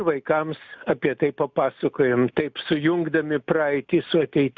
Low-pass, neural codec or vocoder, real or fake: 7.2 kHz; none; real